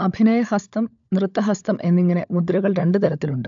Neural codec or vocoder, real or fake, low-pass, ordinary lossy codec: codec, 16 kHz, 16 kbps, FunCodec, trained on LibriTTS, 50 frames a second; fake; 7.2 kHz; AAC, 64 kbps